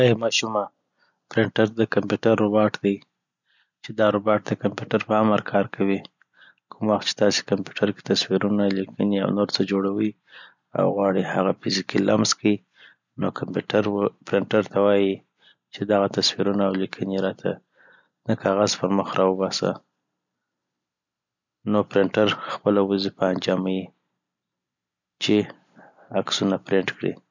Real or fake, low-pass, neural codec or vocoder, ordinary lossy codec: real; 7.2 kHz; none; none